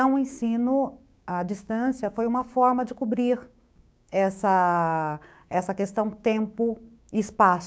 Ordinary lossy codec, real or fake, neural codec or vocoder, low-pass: none; fake; codec, 16 kHz, 6 kbps, DAC; none